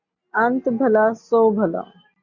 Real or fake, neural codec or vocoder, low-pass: real; none; 7.2 kHz